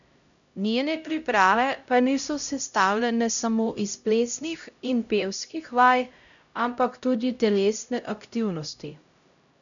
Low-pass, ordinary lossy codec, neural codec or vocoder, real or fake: 7.2 kHz; none; codec, 16 kHz, 0.5 kbps, X-Codec, HuBERT features, trained on LibriSpeech; fake